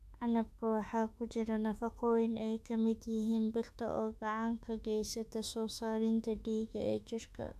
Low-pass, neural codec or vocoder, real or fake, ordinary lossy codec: 14.4 kHz; autoencoder, 48 kHz, 32 numbers a frame, DAC-VAE, trained on Japanese speech; fake; none